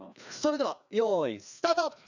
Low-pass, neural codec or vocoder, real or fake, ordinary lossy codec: 7.2 kHz; codec, 16 kHz, 2 kbps, FreqCodec, larger model; fake; none